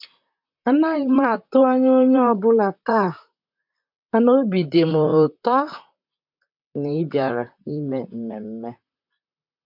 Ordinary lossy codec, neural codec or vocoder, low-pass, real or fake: MP3, 48 kbps; vocoder, 44.1 kHz, 128 mel bands, Pupu-Vocoder; 5.4 kHz; fake